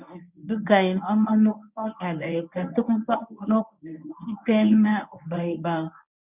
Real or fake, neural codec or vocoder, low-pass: fake; codec, 24 kHz, 0.9 kbps, WavTokenizer, medium speech release version 1; 3.6 kHz